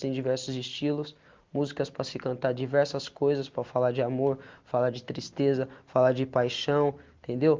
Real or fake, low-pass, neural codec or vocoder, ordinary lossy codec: real; 7.2 kHz; none; Opus, 32 kbps